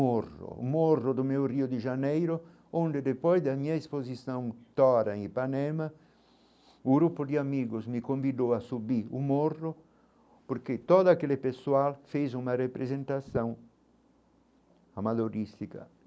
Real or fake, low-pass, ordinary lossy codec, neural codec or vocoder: real; none; none; none